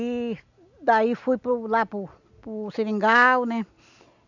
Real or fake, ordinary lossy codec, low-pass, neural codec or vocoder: real; none; 7.2 kHz; none